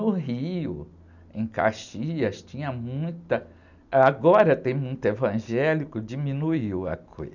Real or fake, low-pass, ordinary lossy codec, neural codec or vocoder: real; 7.2 kHz; none; none